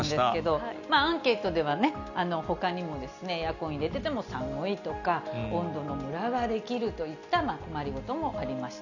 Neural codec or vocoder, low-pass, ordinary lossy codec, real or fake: none; 7.2 kHz; none; real